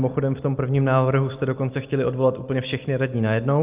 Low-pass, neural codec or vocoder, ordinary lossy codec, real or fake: 3.6 kHz; none; Opus, 24 kbps; real